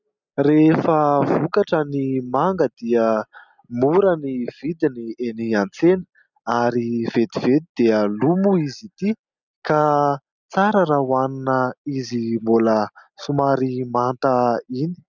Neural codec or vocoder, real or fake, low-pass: none; real; 7.2 kHz